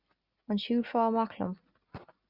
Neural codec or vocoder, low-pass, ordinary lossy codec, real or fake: none; 5.4 kHz; Opus, 64 kbps; real